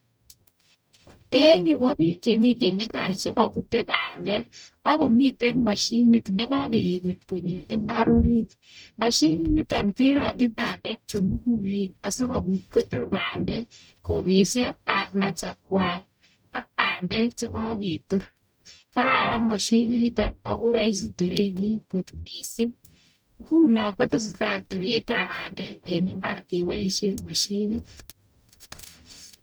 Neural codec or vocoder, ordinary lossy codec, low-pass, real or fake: codec, 44.1 kHz, 0.9 kbps, DAC; none; none; fake